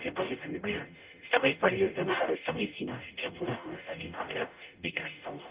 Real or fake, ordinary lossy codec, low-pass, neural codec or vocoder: fake; Opus, 32 kbps; 3.6 kHz; codec, 44.1 kHz, 0.9 kbps, DAC